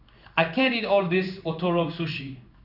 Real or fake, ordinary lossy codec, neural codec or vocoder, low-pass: fake; none; codec, 16 kHz in and 24 kHz out, 1 kbps, XY-Tokenizer; 5.4 kHz